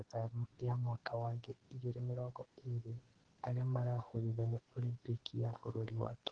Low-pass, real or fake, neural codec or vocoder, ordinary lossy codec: 9.9 kHz; fake; codec, 44.1 kHz, 2.6 kbps, SNAC; Opus, 16 kbps